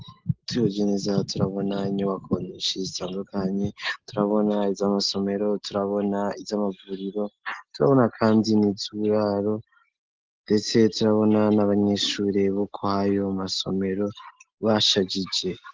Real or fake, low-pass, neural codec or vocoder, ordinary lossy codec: real; 7.2 kHz; none; Opus, 16 kbps